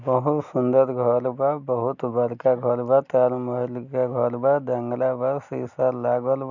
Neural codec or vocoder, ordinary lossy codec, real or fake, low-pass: none; none; real; 7.2 kHz